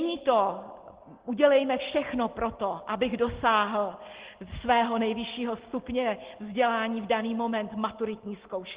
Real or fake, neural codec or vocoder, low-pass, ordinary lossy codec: real; none; 3.6 kHz; Opus, 16 kbps